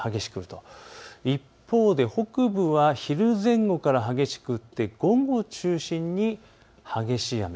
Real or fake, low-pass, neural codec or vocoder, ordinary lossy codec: real; none; none; none